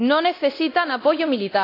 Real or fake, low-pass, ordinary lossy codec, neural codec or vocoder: fake; 5.4 kHz; AAC, 32 kbps; codec, 24 kHz, 0.9 kbps, DualCodec